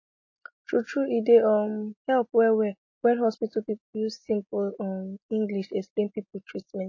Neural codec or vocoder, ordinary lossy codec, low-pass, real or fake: none; MP3, 32 kbps; 7.2 kHz; real